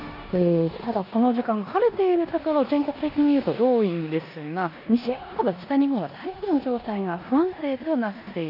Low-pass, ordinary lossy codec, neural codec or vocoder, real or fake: 5.4 kHz; none; codec, 16 kHz in and 24 kHz out, 0.9 kbps, LongCat-Audio-Codec, four codebook decoder; fake